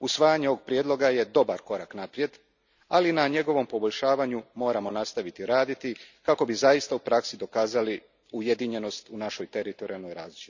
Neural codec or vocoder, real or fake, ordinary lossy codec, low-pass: none; real; none; 7.2 kHz